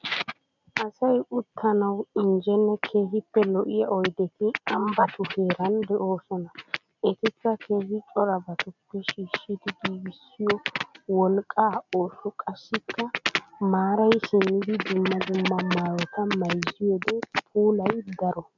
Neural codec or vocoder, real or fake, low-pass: none; real; 7.2 kHz